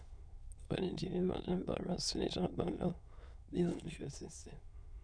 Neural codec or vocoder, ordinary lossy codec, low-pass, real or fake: autoencoder, 22.05 kHz, a latent of 192 numbers a frame, VITS, trained on many speakers; none; 9.9 kHz; fake